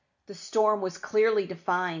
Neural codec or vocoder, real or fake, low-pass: none; real; 7.2 kHz